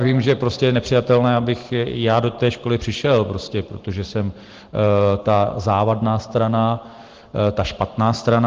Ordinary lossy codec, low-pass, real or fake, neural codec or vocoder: Opus, 16 kbps; 7.2 kHz; real; none